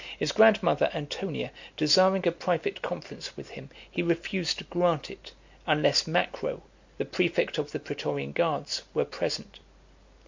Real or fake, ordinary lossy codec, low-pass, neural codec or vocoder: real; MP3, 48 kbps; 7.2 kHz; none